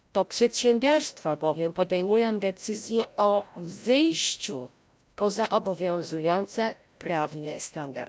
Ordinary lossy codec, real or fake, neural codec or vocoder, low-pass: none; fake; codec, 16 kHz, 0.5 kbps, FreqCodec, larger model; none